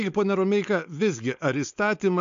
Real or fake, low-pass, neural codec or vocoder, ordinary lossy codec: fake; 7.2 kHz; codec, 16 kHz, 4.8 kbps, FACodec; AAC, 64 kbps